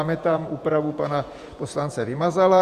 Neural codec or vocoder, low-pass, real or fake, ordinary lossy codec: vocoder, 48 kHz, 128 mel bands, Vocos; 14.4 kHz; fake; Opus, 64 kbps